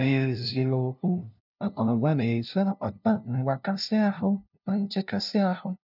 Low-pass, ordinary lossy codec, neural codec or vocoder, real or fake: 5.4 kHz; none; codec, 16 kHz, 0.5 kbps, FunCodec, trained on LibriTTS, 25 frames a second; fake